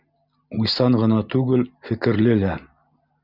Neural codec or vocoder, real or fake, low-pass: none; real; 5.4 kHz